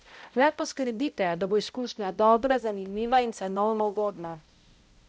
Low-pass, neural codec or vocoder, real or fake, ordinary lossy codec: none; codec, 16 kHz, 0.5 kbps, X-Codec, HuBERT features, trained on balanced general audio; fake; none